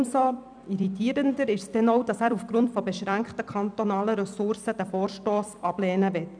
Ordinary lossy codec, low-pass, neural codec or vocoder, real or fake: none; 9.9 kHz; vocoder, 44.1 kHz, 128 mel bands every 256 samples, BigVGAN v2; fake